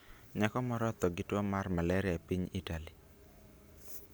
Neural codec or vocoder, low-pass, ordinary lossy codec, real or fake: none; none; none; real